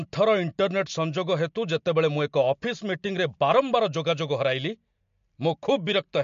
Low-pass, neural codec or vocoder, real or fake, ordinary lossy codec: 7.2 kHz; none; real; MP3, 48 kbps